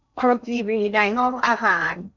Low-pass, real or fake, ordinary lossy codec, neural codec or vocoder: 7.2 kHz; fake; none; codec, 16 kHz in and 24 kHz out, 0.6 kbps, FocalCodec, streaming, 2048 codes